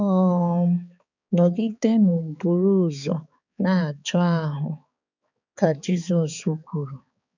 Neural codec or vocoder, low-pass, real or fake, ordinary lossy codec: codec, 16 kHz, 4 kbps, X-Codec, HuBERT features, trained on balanced general audio; 7.2 kHz; fake; none